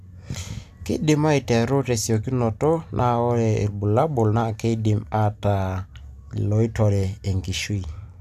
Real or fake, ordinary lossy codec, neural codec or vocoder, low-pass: real; AAC, 96 kbps; none; 14.4 kHz